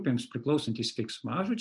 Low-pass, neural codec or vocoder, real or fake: 10.8 kHz; none; real